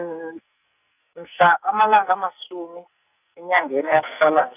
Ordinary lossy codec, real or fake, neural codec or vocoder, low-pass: none; fake; codec, 44.1 kHz, 2.6 kbps, SNAC; 3.6 kHz